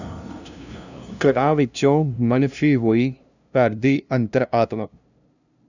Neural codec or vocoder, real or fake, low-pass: codec, 16 kHz, 0.5 kbps, FunCodec, trained on LibriTTS, 25 frames a second; fake; 7.2 kHz